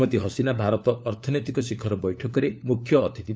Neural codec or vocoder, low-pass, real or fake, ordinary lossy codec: codec, 16 kHz, 4 kbps, FunCodec, trained on LibriTTS, 50 frames a second; none; fake; none